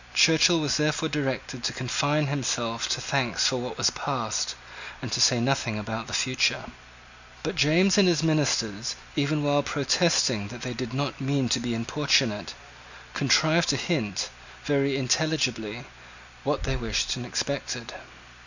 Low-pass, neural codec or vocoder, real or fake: 7.2 kHz; none; real